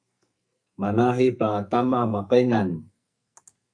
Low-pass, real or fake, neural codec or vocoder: 9.9 kHz; fake; codec, 44.1 kHz, 2.6 kbps, SNAC